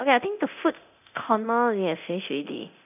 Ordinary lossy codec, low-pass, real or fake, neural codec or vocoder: none; 3.6 kHz; fake; codec, 24 kHz, 0.9 kbps, DualCodec